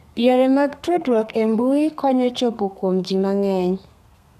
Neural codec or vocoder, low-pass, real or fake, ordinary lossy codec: codec, 32 kHz, 1.9 kbps, SNAC; 14.4 kHz; fake; none